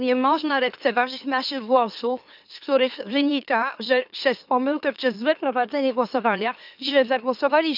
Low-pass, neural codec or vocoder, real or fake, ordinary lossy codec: 5.4 kHz; autoencoder, 44.1 kHz, a latent of 192 numbers a frame, MeloTTS; fake; none